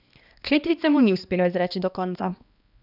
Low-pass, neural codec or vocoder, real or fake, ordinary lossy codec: 5.4 kHz; codec, 16 kHz, 2 kbps, X-Codec, HuBERT features, trained on balanced general audio; fake; none